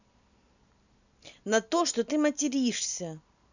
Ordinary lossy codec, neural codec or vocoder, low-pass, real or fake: none; none; 7.2 kHz; real